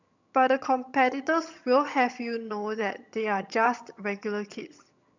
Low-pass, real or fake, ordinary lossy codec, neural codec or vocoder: 7.2 kHz; fake; none; vocoder, 22.05 kHz, 80 mel bands, HiFi-GAN